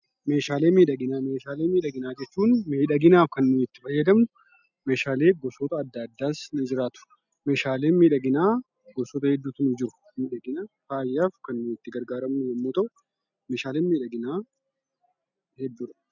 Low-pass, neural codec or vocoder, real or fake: 7.2 kHz; none; real